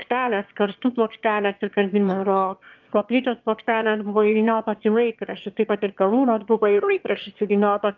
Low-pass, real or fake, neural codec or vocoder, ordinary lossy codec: 7.2 kHz; fake; autoencoder, 22.05 kHz, a latent of 192 numbers a frame, VITS, trained on one speaker; Opus, 24 kbps